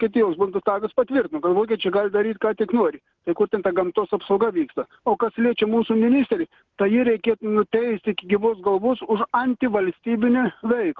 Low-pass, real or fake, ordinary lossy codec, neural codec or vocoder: 7.2 kHz; real; Opus, 16 kbps; none